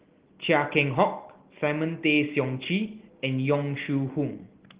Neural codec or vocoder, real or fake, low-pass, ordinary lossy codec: none; real; 3.6 kHz; Opus, 16 kbps